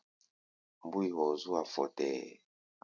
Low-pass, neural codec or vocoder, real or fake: 7.2 kHz; none; real